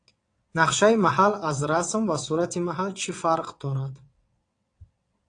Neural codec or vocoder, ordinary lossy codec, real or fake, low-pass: vocoder, 22.05 kHz, 80 mel bands, WaveNeXt; AAC, 48 kbps; fake; 9.9 kHz